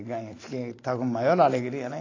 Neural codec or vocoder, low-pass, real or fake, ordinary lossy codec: vocoder, 44.1 kHz, 128 mel bands every 512 samples, BigVGAN v2; 7.2 kHz; fake; AAC, 32 kbps